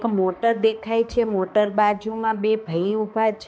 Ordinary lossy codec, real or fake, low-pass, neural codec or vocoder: none; fake; none; codec, 16 kHz, 2 kbps, X-Codec, HuBERT features, trained on balanced general audio